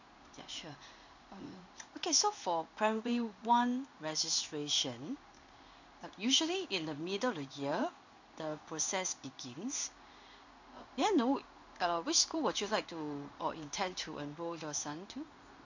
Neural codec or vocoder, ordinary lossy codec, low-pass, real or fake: codec, 16 kHz in and 24 kHz out, 1 kbps, XY-Tokenizer; none; 7.2 kHz; fake